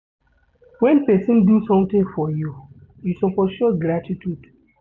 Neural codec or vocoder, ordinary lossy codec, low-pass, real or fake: none; none; 7.2 kHz; real